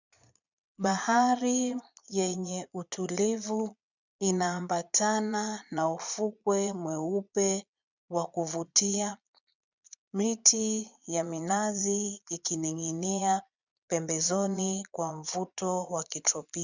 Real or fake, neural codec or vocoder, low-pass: fake; vocoder, 22.05 kHz, 80 mel bands, Vocos; 7.2 kHz